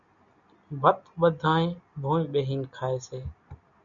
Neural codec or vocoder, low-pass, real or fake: none; 7.2 kHz; real